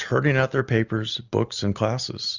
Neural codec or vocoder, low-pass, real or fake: none; 7.2 kHz; real